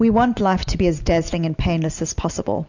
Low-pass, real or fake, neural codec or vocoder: 7.2 kHz; real; none